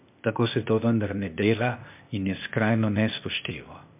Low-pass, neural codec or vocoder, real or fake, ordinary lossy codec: 3.6 kHz; codec, 16 kHz, 0.8 kbps, ZipCodec; fake; MP3, 32 kbps